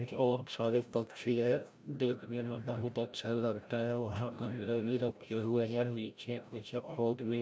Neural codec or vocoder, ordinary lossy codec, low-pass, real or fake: codec, 16 kHz, 0.5 kbps, FreqCodec, larger model; none; none; fake